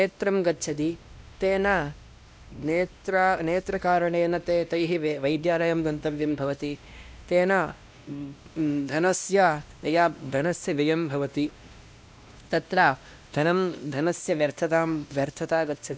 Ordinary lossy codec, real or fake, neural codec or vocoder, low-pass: none; fake; codec, 16 kHz, 1 kbps, X-Codec, WavLM features, trained on Multilingual LibriSpeech; none